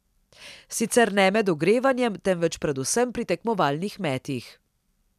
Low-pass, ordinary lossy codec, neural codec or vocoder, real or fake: 14.4 kHz; none; none; real